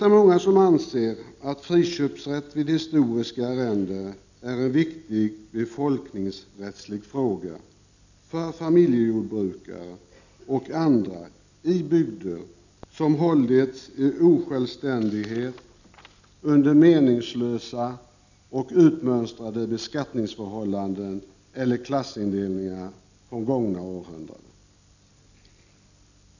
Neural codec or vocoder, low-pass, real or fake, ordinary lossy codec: none; 7.2 kHz; real; none